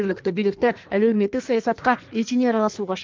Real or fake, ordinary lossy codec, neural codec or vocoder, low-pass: fake; Opus, 32 kbps; codec, 16 kHz in and 24 kHz out, 1.1 kbps, FireRedTTS-2 codec; 7.2 kHz